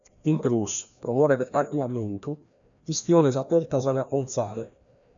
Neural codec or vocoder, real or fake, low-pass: codec, 16 kHz, 1 kbps, FreqCodec, larger model; fake; 7.2 kHz